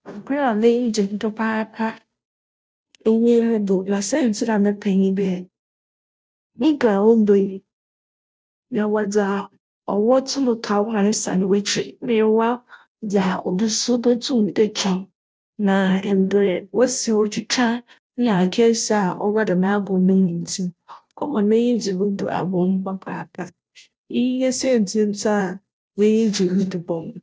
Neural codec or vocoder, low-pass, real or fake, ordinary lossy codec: codec, 16 kHz, 0.5 kbps, FunCodec, trained on Chinese and English, 25 frames a second; none; fake; none